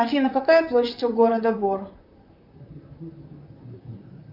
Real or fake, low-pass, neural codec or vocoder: fake; 5.4 kHz; vocoder, 44.1 kHz, 128 mel bands, Pupu-Vocoder